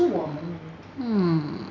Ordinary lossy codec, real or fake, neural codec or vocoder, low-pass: none; real; none; 7.2 kHz